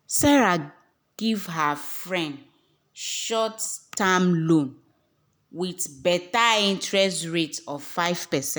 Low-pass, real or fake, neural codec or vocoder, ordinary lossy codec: none; real; none; none